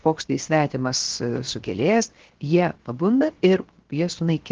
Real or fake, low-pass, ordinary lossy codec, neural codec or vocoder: fake; 7.2 kHz; Opus, 16 kbps; codec, 16 kHz, 0.7 kbps, FocalCodec